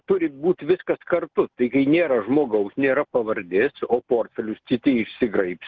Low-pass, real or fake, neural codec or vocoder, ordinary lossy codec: 7.2 kHz; real; none; Opus, 16 kbps